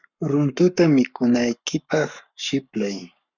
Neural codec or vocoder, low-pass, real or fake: codec, 44.1 kHz, 7.8 kbps, Pupu-Codec; 7.2 kHz; fake